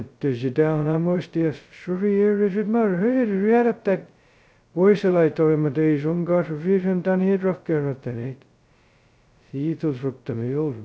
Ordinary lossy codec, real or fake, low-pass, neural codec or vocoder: none; fake; none; codec, 16 kHz, 0.2 kbps, FocalCodec